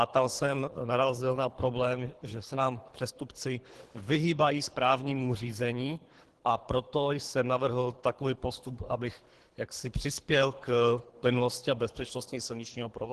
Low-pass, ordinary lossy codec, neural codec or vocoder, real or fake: 10.8 kHz; Opus, 16 kbps; codec, 24 kHz, 3 kbps, HILCodec; fake